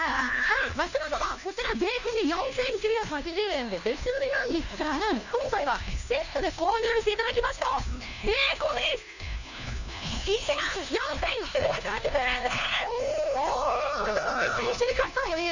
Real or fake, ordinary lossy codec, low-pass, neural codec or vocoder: fake; none; 7.2 kHz; codec, 16 kHz, 1 kbps, FunCodec, trained on LibriTTS, 50 frames a second